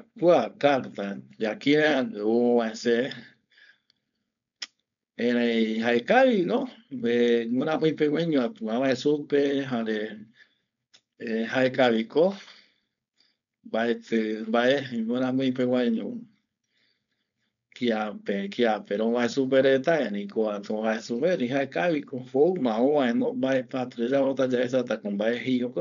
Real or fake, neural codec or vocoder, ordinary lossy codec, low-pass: fake; codec, 16 kHz, 4.8 kbps, FACodec; none; 7.2 kHz